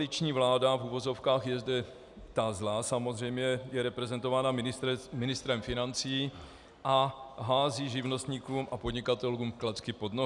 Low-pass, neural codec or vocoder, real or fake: 10.8 kHz; none; real